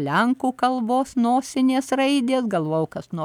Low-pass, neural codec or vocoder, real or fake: 19.8 kHz; none; real